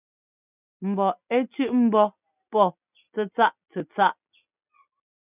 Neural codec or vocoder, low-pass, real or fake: none; 3.6 kHz; real